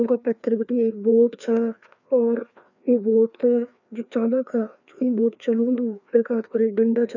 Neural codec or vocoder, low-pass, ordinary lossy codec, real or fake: codec, 16 kHz, 2 kbps, FreqCodec, larger model; 7.2 kHz; none; fake